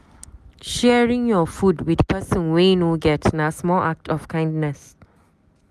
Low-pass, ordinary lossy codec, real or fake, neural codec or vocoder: 14.4 kHz; none; real; none